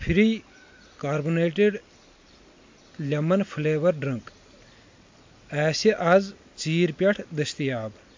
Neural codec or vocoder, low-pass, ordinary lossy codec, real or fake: none; 7.2 kHz; MP3, 48 kbps; real